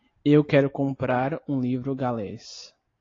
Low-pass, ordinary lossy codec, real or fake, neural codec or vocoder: 7.2 kHz; AAC, 32 kbps; real; none